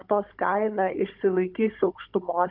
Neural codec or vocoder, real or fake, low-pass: codec, 16 kHz, 16 kbps, FunCodec, trained on Chinese and English, 50 frames a second; fake; 5.4 kHz